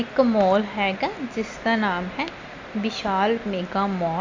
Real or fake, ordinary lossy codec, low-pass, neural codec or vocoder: real; MP3, 64 kbps; 7.2 kHz; none